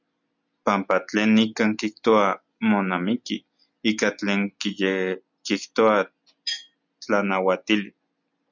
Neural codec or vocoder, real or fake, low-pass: none; real; 7.2 kHz